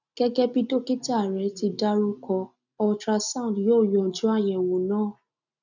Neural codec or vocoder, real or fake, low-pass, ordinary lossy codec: none; real; 7.2 kHz; none